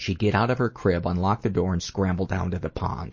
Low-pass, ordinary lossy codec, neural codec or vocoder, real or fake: 7.2 kHz; MP3, 32 kbps; none; real